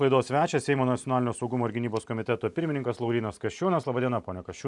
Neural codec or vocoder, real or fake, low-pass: none; real; 10.8 kHz